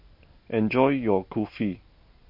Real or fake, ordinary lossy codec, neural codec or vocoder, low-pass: real; MP3, 32 kbps; none; 5.4 kHz